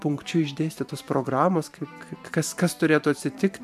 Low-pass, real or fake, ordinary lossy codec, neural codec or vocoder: 14.4 kHz; fake; MP3, 96 kbps; vocoder, 44.1 kHz, 128 mel bands every 512 samples, BigVGAN v2